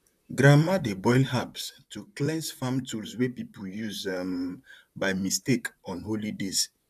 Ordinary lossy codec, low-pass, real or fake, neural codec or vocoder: none; 14.4 kHz; fake; vocoder, 44.1 kHz, 128 mel bands, Pupu-Vocoder